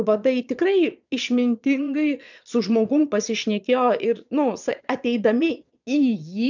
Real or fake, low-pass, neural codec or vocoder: fake; 7.2 kHz; vocoder, 22.05 kHz, 80 mel bands, Vocos